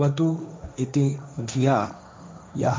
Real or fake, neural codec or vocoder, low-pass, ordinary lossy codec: fake; codec, 16 kHz, 1.1 kbps, Voila-Tokenizer; none; none